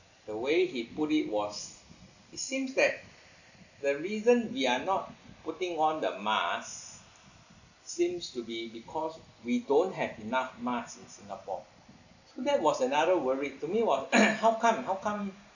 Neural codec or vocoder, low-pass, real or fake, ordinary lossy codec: none; 7.2 kHz; real; none